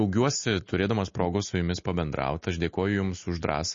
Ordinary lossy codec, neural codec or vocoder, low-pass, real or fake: MP3, 32 kbps; none; 7.2 kHz; real